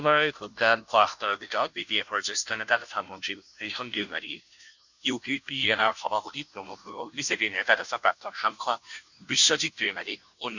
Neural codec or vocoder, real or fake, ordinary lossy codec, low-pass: codec, 16 kHz, 0.5 kbps, FunCodec, trained on Chinese and English, 25 frames a second; fake; none; 7.2 kHz